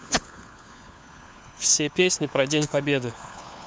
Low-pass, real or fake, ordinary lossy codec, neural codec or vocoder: none; fake; none; codec, 16 kHz, 4 kbps, FunCodec, trained on LibriTTS, 50 frames a second